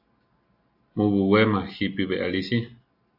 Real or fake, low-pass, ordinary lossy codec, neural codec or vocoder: real; 5.4 kHz; AAC, 48 kbps; none